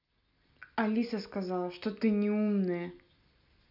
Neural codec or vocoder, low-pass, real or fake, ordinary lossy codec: none; 5.4 kHz; real; none